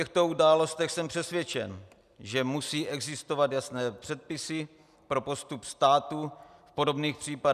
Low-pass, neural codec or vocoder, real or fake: 14.4 kHz; none; real